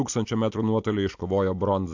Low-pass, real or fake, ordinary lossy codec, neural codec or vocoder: 7.2 kHz; real; AAC, 48 kbps; none